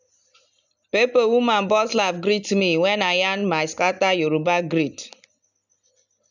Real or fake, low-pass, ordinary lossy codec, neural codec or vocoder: real; 7.2 kHz; none; none